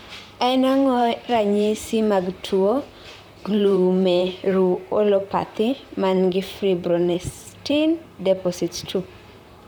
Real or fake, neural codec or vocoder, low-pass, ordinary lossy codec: fake; vocoder, 44.1 kHz, 128 mel bands, Pupu-Vocoder; none; none